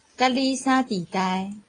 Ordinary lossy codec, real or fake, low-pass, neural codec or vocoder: AAC, 32 kbps; real; 9.9 kHz; none